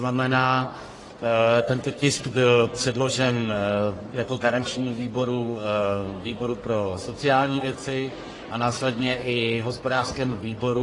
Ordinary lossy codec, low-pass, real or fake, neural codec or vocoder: AAC, 32 kbps; 10.8 kHz; fake; codec, 44.1 kHz, 1.7 kbps, Pupu-Codec